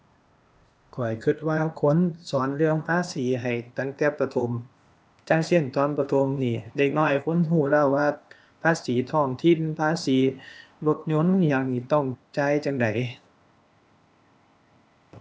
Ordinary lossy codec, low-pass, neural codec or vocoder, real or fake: none; none; codec, 16 kHz, 0.8 kbps, ZipCodec; fake